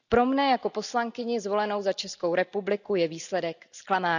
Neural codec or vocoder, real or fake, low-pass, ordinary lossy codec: none; real; 7.2 kHz; none